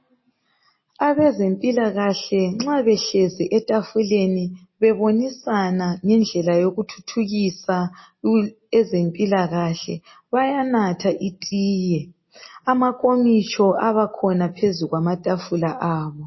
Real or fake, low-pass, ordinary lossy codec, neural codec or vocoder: real; 7.2 kHz; MP3, 24 kbps; none